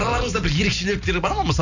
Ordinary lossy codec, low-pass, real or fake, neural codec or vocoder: none; 7.2 kHz; real; none